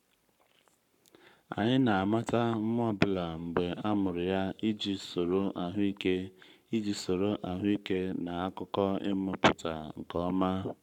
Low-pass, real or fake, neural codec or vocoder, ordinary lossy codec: 19.8 kHz; fake; codec, 44.1 kHz, 7.8 kbps, Pupu-Codec; none